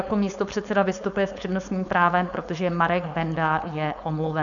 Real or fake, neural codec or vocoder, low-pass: fake; codec, 16 kHz, 4.8 kbps, FACodec; 7.2 kHz